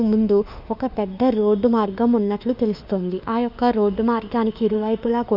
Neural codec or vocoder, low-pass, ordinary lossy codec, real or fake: autoencoder, 48 kHz, 32 numbers a frame, DAC-VAE, trained on Japanese speech; 5.4 kHz; none; fake